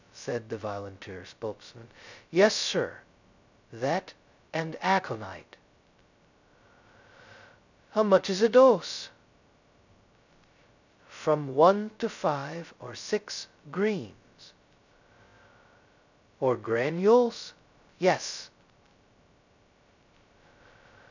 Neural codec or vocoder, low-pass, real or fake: codec, 16 kHz, 0.2 kbps, FocalCodec; 7.2 kHz; fake